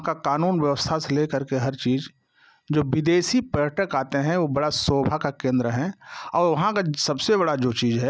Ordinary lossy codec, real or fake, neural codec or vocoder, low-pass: none; real; none; none